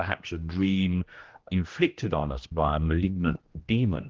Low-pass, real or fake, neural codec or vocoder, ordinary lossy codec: 7.2 kHz; fake; codec, 16 kHz, 1 kbps, X-Codec, HuBERT features, trained on general audio; Opus, 16 kbps